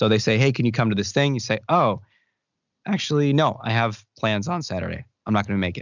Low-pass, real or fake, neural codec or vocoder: 7.2 kHz; real; none